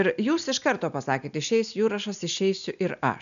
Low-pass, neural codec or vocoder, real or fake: 7.2 kHz; none; real